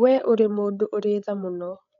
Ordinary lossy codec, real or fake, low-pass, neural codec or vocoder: none; fake; 7.2 kHz; codec, 16 kHz, 8 kbps, FreqCodec, larger model